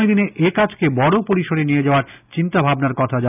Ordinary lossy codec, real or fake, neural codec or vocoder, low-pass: none; real; none; 3.6 kHz